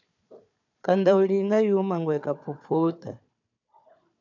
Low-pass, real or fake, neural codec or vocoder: 7.2 kHz; fake; codec, 16 kHz, 4 kbps, FunCodec, trained on Chinese and English, 50 frames a second